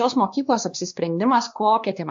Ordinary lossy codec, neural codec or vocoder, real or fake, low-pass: AAC, 64 kbps; codec, 16 kHz, 2 kbps, X-Codec, WavLM features, trained on Multilingual LibriSpeech; fake; 7.2 kHz